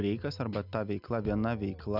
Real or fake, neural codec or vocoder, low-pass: real; none; 5.4 kHz